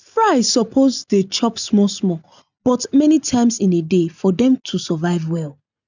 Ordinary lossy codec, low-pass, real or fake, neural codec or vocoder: none; 7.2 kHz; real; none